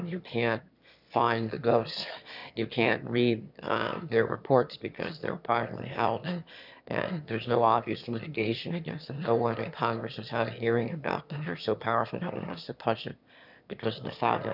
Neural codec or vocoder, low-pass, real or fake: autoencoder, 22.05 kHz, a latent of 192 numbers a frame, VITS, trained on one speaker; 5.4 kHz; fake